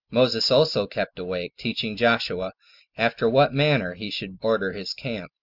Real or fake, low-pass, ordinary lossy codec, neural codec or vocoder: real; 5.4 kHz; Opus, 64 kbps; none